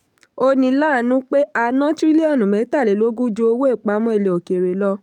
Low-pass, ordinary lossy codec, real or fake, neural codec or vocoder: 19.8 kHz; none; fake; codec, 44.1 kHz, 7.8 kbps, DAC